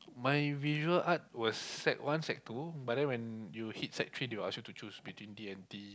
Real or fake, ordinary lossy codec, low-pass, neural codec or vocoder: real; none; none; none